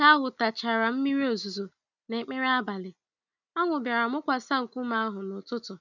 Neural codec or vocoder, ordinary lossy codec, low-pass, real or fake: none; none; 7.2 kHz; real